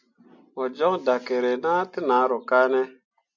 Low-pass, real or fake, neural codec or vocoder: 7.2 kHz; real; none